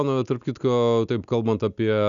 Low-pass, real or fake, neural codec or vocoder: 7.2 kHz; real; none